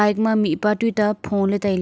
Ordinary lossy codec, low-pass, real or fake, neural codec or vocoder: none; none; real; none